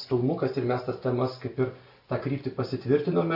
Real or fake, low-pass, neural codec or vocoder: real; 5.4 kHz; none